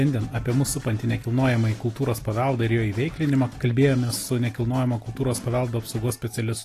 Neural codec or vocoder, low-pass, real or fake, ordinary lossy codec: none; 14.4 kHz; real; AAC, 48 kbps